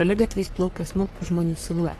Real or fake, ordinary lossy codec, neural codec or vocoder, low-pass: fake; AAC, 48 kbps; codec, 44.1 kHz, 3.4 kbps, Pupu-Codec; 14.4 kHz